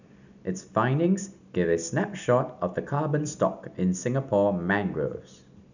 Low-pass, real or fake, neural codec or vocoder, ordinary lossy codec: 7.2 kHz; real; none; none